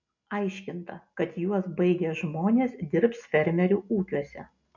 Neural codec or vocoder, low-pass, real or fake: none; 7.2 kHz; real